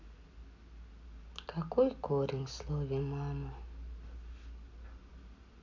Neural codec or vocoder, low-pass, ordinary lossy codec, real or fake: none; 7.2 kHz; MP3, 64 kbps; real